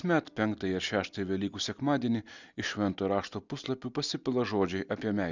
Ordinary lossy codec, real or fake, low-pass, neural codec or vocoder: Opus, 64 kbps; real; 7.2 kHz; none